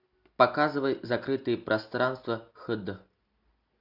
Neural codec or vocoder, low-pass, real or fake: none; 5.4 kHz; real